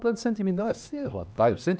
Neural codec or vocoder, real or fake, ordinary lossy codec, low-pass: codec, 16 kHz, 2 kbps, X-Codec, HuBERT features, trained on LibriSpeech; fake; none; none